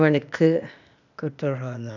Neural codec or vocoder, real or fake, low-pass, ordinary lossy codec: codec, 16 kHz, 0.8 kbps, ZipCodec; fake; 7.2 kHz; none